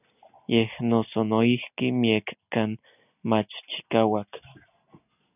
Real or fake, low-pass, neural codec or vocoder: real; 3.6 kHz; none